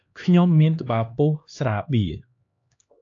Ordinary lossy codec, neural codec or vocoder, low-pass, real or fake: AAC, 48 kbps; codec, 16 kHz, 2 kbps, X-Codec, HuBERT features, trained on LibriSpeech; 7.2 kHz; fake